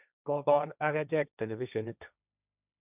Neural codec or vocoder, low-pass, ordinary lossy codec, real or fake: codec, 16 kHz, 1.1 kbps, Voila-Tokenizer; 3.6 kHz; none; fake